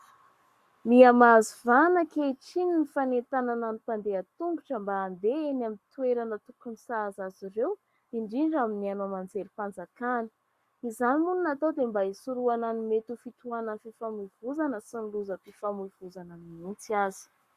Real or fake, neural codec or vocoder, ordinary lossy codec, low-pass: fake; codec, 44.1 kHz, 7.8 kbps, Pupu-Codec; Opus, 64 kbps; 14.4 kHz